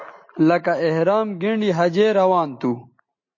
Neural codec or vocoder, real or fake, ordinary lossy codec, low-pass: none; real; MP3, 32 kbps; 7.2 kHz